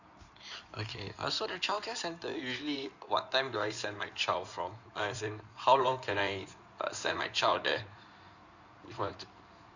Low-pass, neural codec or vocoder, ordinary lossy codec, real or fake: 7.2 kHz; codec, 16 kHz in and 24 kHz out, 2.2 kbps, FireRedTTS-2 codec; none; fake